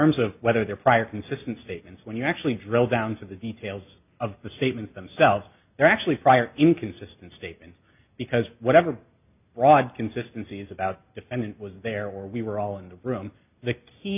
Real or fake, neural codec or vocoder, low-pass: real; none; 3.6 kHz